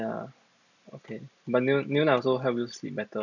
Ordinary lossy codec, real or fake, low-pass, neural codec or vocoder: none; real; 7.2 kHz; none